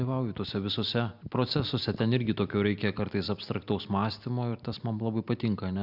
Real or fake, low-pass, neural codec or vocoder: real; 5.4 kHz; none